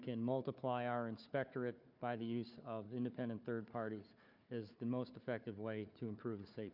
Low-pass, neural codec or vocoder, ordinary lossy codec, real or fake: 5.4 kHz; codec, 16 kHz, 4 kbps, FunCodec, trained on Chinese and English, 50 frames a second; MP3, 48 kbps; fake